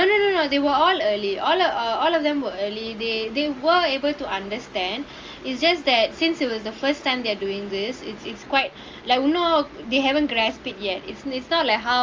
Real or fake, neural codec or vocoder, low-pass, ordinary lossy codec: real; none; 7.2 kHz; Opus, 32 kbps